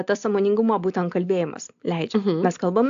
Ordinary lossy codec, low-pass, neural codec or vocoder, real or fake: AAC, 64 kbps; 7.2 kHz; none; real